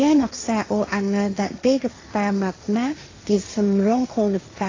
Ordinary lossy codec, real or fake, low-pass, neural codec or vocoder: AAC, 32 kbps; fake; 7.2 kHz; codec, 16 kHz, 1.1 kbps, Voila-Tokenizer